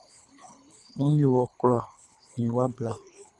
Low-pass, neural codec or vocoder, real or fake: 10.8 kHz; codec, 24 kHz, 3 kbps, HILCodec; fake